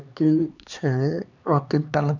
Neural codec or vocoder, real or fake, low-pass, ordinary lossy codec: codec, 16 kHz, 2 kbps, X-Codec, HuBERT features, trained on LibriSpeech; fake; 7.2 kHz; none